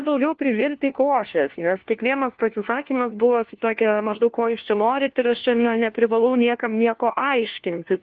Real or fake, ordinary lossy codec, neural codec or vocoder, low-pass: fake; Opus, 16 kbps; codec, 16 kHz, 1 kbps, FunCodec, trained on LibriTTS, 50 frames a second; 7.2 kHz